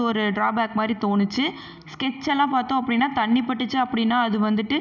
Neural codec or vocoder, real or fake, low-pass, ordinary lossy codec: none; real; 7.2 kHz; none